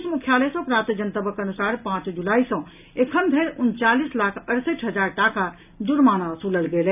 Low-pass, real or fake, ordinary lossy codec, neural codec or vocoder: 3.6 kHz; real; none; none